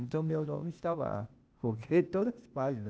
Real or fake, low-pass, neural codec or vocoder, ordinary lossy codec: fake; none; codec, 16 kHz, 0.8 kbps, ZipCodec; none